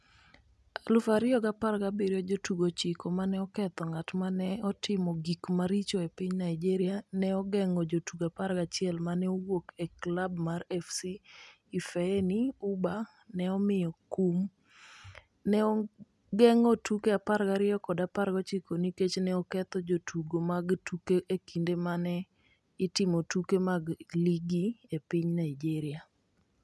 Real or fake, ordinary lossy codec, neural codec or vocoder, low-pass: real; none; none; none